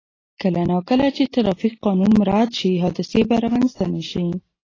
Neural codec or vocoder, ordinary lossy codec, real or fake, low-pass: none; AAC, 32 kbps; real; 7.2 kHz